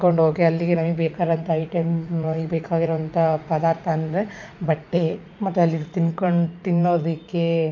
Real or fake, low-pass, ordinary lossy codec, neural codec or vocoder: fake; 7.2 kHz; none; vocoder, 44.1 kHz, 80 mel bands, Vocos